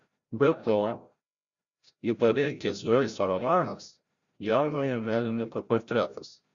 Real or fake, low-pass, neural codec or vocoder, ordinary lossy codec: fake; 7.2 kHz; codec, 16 kHz, 0.5 kbps, FreqCodec, larger model; Opus, 64 kbps